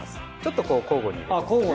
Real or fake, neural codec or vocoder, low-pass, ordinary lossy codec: real; none; none; none